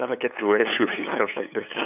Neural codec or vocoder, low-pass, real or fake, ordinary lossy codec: codec, 16 kHz, 8 kbps, FunCodec, trained on LibriTTS, 25 frames a second; 3.6 kHz; fake; none